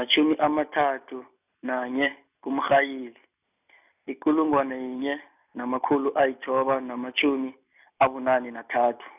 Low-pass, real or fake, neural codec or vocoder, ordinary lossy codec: 3.6 kHz; real; none; none